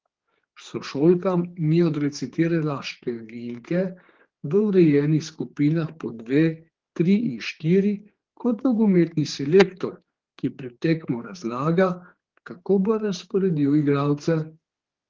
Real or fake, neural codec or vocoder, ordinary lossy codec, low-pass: fake; codec, 16 kHz, 4 kbps, X-Codec, HuBERT features, trained on general audio; Opus, 16 kbps; 7.2 kHz